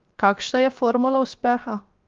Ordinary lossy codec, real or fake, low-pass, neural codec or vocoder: Opus, 32 kbps; fake; 7.2 kHz; codec, 16 kHz, 0.7 kbps, FocalCodec